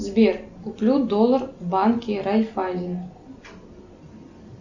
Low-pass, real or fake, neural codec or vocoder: 7.2 kHz; real; none